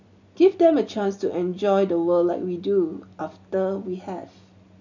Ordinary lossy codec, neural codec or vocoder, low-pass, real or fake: none; none; 7.2 kHz; real